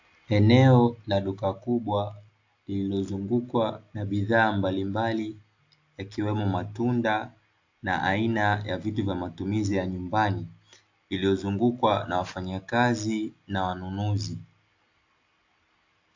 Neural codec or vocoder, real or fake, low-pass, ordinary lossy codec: none; real; 7.2 kHz; AAC, 48 kbps